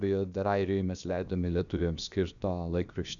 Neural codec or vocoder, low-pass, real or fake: codec, 16 kHz, about 1 kbps, DyCAST, with the encoder's durations; 7.2 kHz; fake